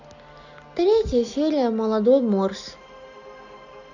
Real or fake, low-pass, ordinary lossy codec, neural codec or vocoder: real; 7.2 kHz; none; none